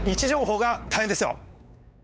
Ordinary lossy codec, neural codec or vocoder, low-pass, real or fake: none; codec, 16 kHz, 4 kbps, X-Codec, WavLM features, trained on Multilingual LibriSpeech; none; fake